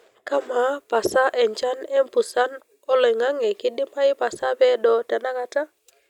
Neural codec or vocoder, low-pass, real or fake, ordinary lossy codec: none; 19.8 kHz; real; none